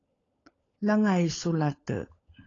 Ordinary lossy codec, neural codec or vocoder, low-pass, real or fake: AAC, 32 kbps; codec, 16 kHz, 16 kbps, FunCodec, trained on LibriTTS, 50 frames a second; 7.2 kHz; fake